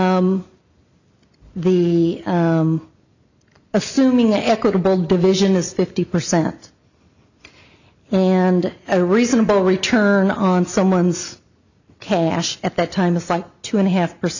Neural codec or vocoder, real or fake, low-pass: none; real; 7.2 kHz